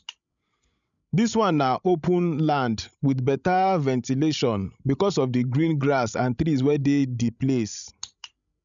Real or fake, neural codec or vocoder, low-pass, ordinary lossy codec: fake; codec, 16 kHz, 16 kbps, FreqCodec, larger model; 7.2 kHz; none